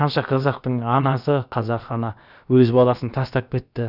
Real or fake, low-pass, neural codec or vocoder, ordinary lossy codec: fake; 5.4 kHz; codec, 16 kHz, about 1 kbps, DyCAST, with the encoder's durations; none